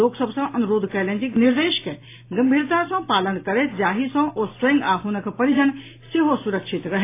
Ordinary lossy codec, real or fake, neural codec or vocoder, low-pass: AAC, 24 kbps; real; none; 3.6 kHz